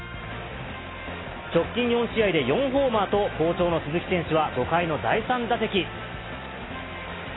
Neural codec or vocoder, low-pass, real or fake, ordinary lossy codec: none; 7.2 kHz; real; AAC, 16 kbps